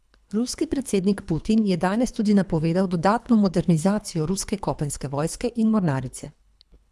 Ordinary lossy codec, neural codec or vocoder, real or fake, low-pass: none; codec, 24 kHz, 3 kbps, HILCodec; fake; none